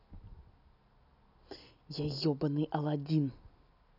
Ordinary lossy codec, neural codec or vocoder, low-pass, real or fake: none; none; 5.4 kHz; real